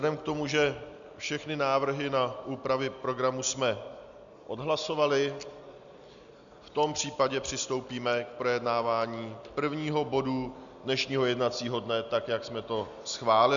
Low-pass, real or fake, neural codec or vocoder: 7.2 kHz; real; none